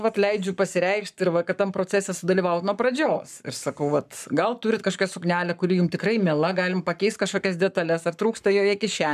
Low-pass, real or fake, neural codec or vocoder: 14.4 kHz; fake; codec, 44.1 kHz, 7.8 kbps, Pupu-Codec